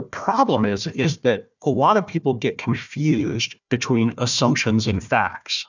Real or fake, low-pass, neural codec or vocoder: fake; 7.2 kHz; codec, 16 kHz, 1 kbps, FunCodec, trained on Chinese and English, 50 frames a second